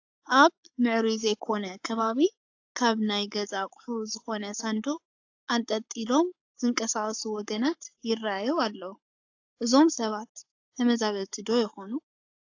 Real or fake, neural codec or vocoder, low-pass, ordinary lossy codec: fake; codec, 44.1 kHz, 7.8 kbps, Pupu-Codec; 7.2 kHz; AAC, 48 kbps